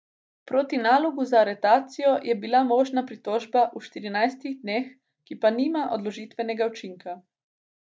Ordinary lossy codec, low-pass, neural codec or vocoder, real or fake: none; none; none; real